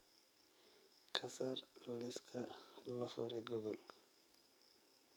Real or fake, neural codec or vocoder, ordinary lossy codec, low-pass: fake; codec, 44.1 kHz, 2.6 kbps, SNAC; none; none